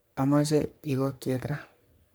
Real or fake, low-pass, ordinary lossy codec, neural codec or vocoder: fake; none; none; codec, 44.1 kHz, 3.4 kbps, Pupu-Codec